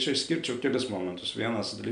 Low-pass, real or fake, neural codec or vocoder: 9.9 kHz; real; none